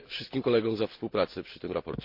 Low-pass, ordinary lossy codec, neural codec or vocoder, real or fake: 5.4 kHz; Opus, 24 kbps; none; real